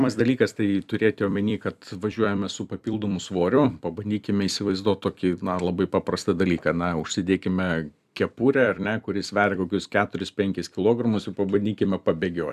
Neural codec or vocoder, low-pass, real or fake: vocoder, 44.1 kHz, 128 mel bands every 256 samples, BigVGAN v2; 14.4 kHz; fake